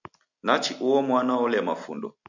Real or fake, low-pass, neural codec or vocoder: real; 7.2 kHz; none